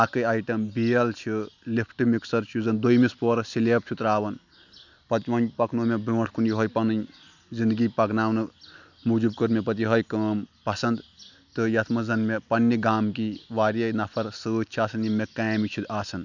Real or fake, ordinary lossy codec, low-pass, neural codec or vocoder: real; none; 7.2 kHz; none